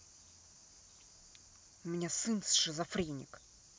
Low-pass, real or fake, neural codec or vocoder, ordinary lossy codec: none; real; none; none